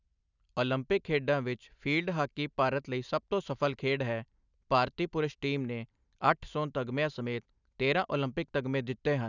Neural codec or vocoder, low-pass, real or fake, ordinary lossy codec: none; 7.2 kHz; real; none